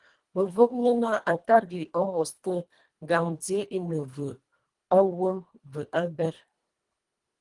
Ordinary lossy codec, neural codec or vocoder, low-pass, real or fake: Opus, 24 kbps; codec, 24 kHz, 1.5 kbps, HILCodec; 10.8 kHz; fake